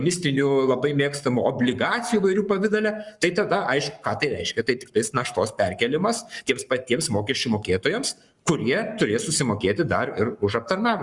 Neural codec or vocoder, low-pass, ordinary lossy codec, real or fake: codec, 44.1 kHz, 7.8 kbps, Pupu-Codec; 10.8 kHz; Opus, 64 kbps; fake